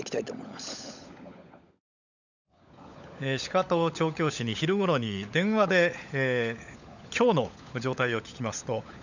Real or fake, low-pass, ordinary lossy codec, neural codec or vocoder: fake; 7.2 kHz; none; codec, 16 kHz, 16 kbps, FunCodec, trained on LibriTTS, 50 frames a second